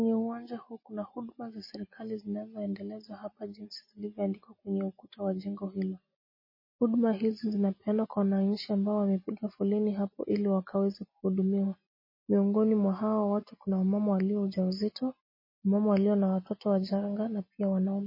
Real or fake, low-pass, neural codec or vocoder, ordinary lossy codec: real; 5.4 kHz; none; MP3, 24 kbps